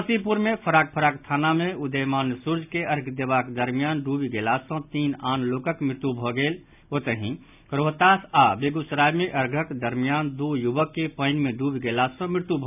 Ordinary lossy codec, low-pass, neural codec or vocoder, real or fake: none; 3.6 kHz; none; real